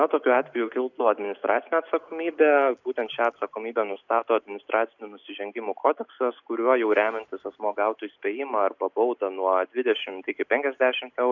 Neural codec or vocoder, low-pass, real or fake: none; 7.2 kHz; real